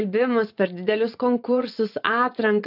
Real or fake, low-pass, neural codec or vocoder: fake; 5.4 kHz; vocoder, 24 kHz, 100 mel bands, Vocos